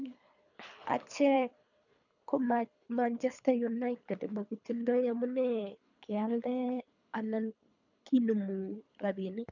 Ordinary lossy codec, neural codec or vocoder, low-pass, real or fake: none; codec, 24 kHz, 3 kbps, HILCodec; 7.2 kHz; fake